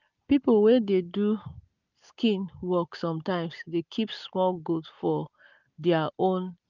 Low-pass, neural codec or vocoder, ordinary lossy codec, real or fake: 7.2 kHz; none; none; real